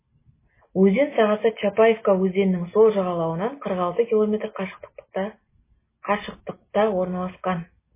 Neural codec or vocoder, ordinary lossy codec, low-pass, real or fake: none; MP3, 16 kbps; 3.6 kHz; real